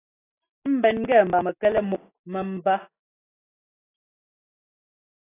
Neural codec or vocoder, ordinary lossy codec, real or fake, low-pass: none; AAC, 16 kbps; real; 3.6 kHz